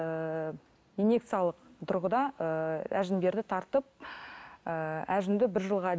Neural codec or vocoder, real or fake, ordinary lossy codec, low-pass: none; real; none; none